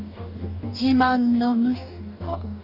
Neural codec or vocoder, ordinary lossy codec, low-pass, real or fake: codec, 44.1 kHz, 2.6 kbps, DAC; none; 5.4 kHz; fake